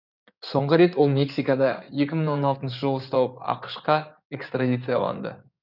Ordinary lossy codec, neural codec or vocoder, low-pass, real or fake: none; codec, 16 kHz in and 24 kHz out, 2.2 kbps, FireRedTTS-2 codec; 5.4 kHz; fake